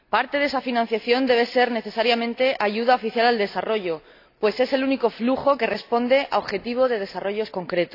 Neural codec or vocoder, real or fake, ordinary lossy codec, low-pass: none; real; AAC, 32 kbps; 5.4 kHz